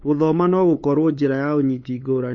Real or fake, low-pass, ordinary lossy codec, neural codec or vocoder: fake; 7.2 kHz; MP3, 32 kbps; codec, 16 kHz, 8 kbps, FunCodec, trained on LibriTTS, 25 frames a second